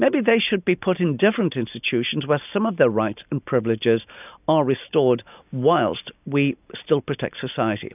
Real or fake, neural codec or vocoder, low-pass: real; none; 3.6 kHz